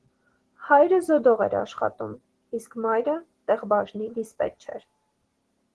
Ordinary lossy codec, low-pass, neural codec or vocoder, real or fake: Opus, 16 kbps; 10.8 kHz; none; real